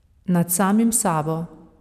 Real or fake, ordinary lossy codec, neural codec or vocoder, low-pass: fake; none; vocoder, 44.1 kHz, 128 mel bands every 256 samples, BigVGAN v2; 14.4 kHz